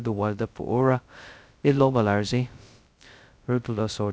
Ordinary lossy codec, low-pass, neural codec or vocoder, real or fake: none; none; codec, 16 kHz, 0.2 kbps, FocalCodec; fake